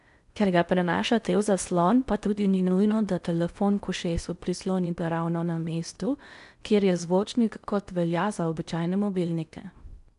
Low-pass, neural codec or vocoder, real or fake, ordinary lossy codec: 10.8 kHz; codec, 16 kHz in and 24 kHz out, 0.6 kbps, FocalCodec, streaming, 4096 codes; fake; none